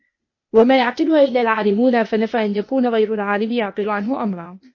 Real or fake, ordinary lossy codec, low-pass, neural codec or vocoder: fake; MP3, 32 kbps; 7.2 kHz; codec, 16 kHz, 0.8 kbps, ZipCodec